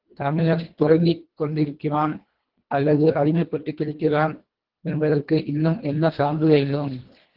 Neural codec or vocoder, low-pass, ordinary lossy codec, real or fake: codec, 24 kHz, 1.5 kbps, HILCodec; 5.4 kHz; Opus, 16 kbps; fake